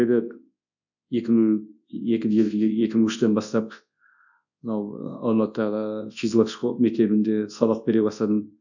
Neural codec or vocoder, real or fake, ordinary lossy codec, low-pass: codec, 24 kHz, 0.9 kbps, WavTokenizer, large speech release; fake; none; 7.2 kHz